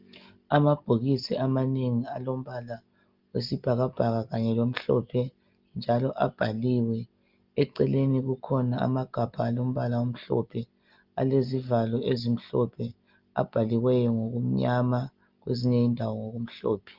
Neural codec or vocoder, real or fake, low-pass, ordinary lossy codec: none; real; 5.4 kHz; Opus, 24 kbps